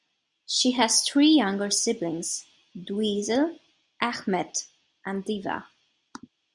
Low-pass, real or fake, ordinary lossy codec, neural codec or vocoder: 10.8 kHz; real; MP3, 96 kbps; none